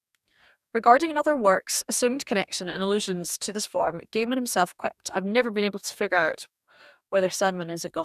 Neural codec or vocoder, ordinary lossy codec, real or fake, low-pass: codec, 44.1 kHz, 2.6 kbps, DAC; none; fake; 14.4 kHz